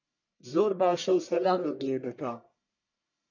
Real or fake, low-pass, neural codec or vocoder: fake; 7.2 kHz; codec, 44.1 kHz, 1.7 kbps, Pupu-Codec